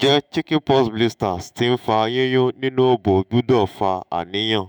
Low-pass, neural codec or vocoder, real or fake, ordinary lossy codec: none; autoencoder, 48 kHz, 128 numbers a frame, DAC-VAE, trained on Japanese speech; fake; none